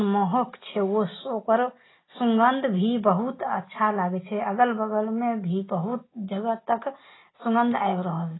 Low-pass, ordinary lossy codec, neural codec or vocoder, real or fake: 7.2 kHz; AAC, 16 kbps; none; real